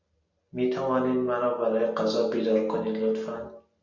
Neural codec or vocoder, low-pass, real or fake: none; 7.2 kHz; real